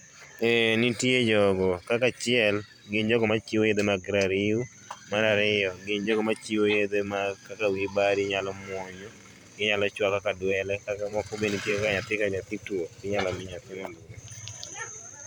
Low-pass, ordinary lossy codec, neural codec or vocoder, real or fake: 19.8 kHz; none; vocoder, 44.1 kHz, 128 mel bands every 512 samples, BigVGAN v2; fake